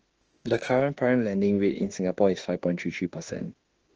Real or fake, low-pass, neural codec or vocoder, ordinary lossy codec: fake; 7.2 kHz; autoencoder, 48 kHz, 32 numbers a frame, DAC-VAE, trained on Japanese speech; Opus, 16 kbps